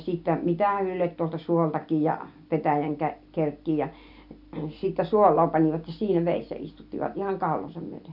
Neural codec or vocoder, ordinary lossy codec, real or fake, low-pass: none; none; real; 5.4 kHz